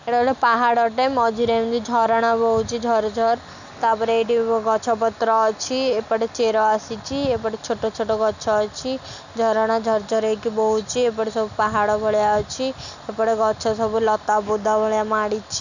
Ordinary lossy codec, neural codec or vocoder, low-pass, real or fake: none; none; 7.2 kHz; real